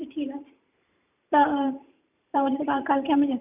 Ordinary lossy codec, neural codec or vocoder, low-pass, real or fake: none; vocoder, 44.1 kHz, 128 mel bands every 512 samples, BigVGAN v2; 3.6 kHz; fake